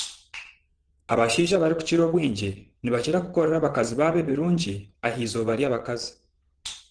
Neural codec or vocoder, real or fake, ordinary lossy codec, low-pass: vocoder, 22.05 kHz, 80 mel bands, WaveNeXt; fake; Opus, 16 kbps; 9.9 kHz